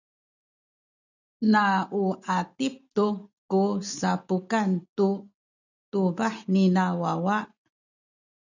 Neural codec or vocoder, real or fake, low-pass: none; real; 7.2 kHz